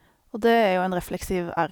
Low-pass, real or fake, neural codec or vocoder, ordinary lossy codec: none; real; none; none